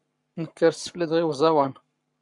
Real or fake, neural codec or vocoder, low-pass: fake; codec, 44.1 kHz, 7.8 kbps, Pupu-Codec; 10.8 kHz